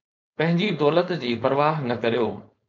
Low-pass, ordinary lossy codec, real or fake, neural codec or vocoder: 7.2 kHz; MP3, 64 kbps; fake; codec, 16 kHz, 4.8 kbps, FACodec